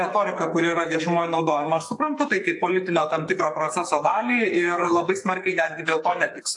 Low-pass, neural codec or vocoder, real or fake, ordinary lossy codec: 10.8 kHz; codec, 44.1 kHz, 2.6 kbps, SNAC; fake; AAC, 64 kbps